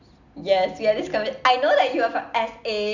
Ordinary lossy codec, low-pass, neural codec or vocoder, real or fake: none; 7.2 kHz; none; real